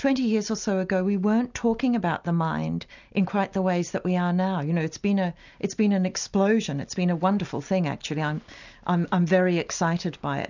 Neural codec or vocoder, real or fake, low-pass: none; real; 7.2 kHz